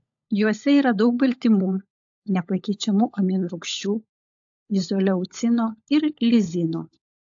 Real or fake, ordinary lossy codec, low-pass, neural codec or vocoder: fake; AAC, 64 kbps; 7.2 kHz; codec, 16 kHz, 16 kbps, FunCodec, trained on LibriTTS, 50 frames a second